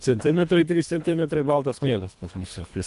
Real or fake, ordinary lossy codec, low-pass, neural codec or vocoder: fake; AAC, 96 kbps; 10.8 kHz; codec, 24 kHz, 1.5 kbps, HILCodec